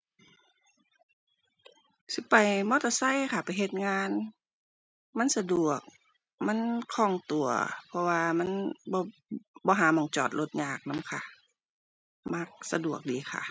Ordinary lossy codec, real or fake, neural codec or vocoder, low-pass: none; real; none; none